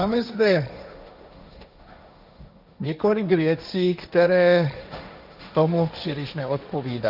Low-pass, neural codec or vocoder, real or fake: 5.4 kHz; codec, 16 kHz, 1.1 kbps, Voila-Tokenizer; fake